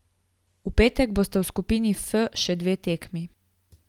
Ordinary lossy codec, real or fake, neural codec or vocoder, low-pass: Opus, 32 kbps; real; none; 19.8 kHz